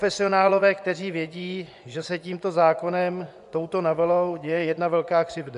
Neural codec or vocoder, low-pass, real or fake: vocoder, 24 kHz, 100 mel bands, Vocos; 10.8 kHz; fake